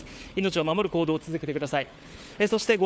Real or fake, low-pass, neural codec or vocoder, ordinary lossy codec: fake; none; codec, 16 kHz, 4 kbps, FunCodec, trained on Chinese and English, 50 frames a second; none